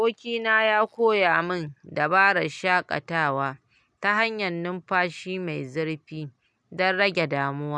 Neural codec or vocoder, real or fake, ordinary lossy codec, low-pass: none; real; none; none